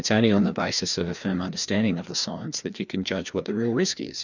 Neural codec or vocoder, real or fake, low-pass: codec, 16 kHz, 2 kbps, FreqCodec, larger model; fake; 7.2 kHz